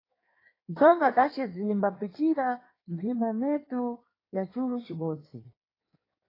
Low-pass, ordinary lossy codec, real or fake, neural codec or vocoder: 5.4 kHz; AAC, 24 kbps; fake; codec, 16 kHz in and 24 kHz out, 1.1 kbps, FireRedTTS-2 codec